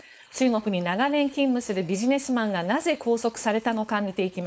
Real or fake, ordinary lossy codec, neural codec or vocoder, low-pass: fake; none; codec, 16 kHz, 4.8 kbps, FACodec; none